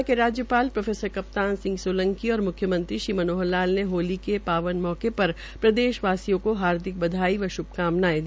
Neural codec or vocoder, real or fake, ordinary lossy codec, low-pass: none; real; none; none